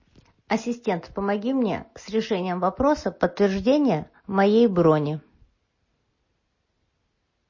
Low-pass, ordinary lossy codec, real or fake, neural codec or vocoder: 7.2 kHz; MP3, 32 kbps; real; none